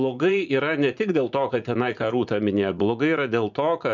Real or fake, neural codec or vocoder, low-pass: fake; vocoder, 44.1 kHz, 80 mel bands, Vocos; 7.2 kHz